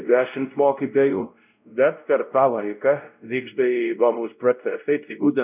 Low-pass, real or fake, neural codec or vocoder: 3.6 kHz; fake; codec, 16 kHz, 0.5 kbps, X-Codec, WavLM features, trained on Multilingual LibriSpeech